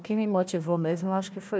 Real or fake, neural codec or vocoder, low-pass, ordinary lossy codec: fake; codec, 16 kHz, 1 kbps, FunCodec, trained on Chinese and English, 50 frames a second; none; none